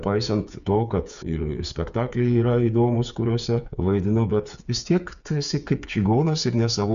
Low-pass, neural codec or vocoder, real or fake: 7.2 kHz; codec, 16 kHz, 8 kbps, FreqCodec, smaller model; fake